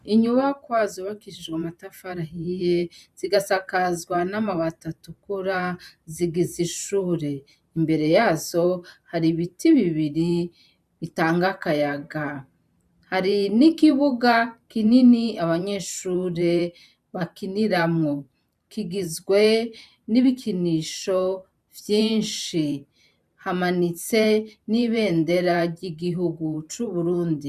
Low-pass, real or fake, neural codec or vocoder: 14.4 kHz; fake; vocoder, 44.1 kHz, 128 mel bands every 512 samples, BigVGAN v2